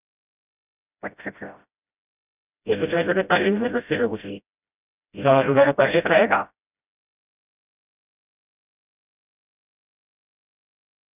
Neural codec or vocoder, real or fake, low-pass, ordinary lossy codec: codec, 16 kHz, 0.5 kbps, FreqCodec, smaller model; fake; 3.6 kHz; none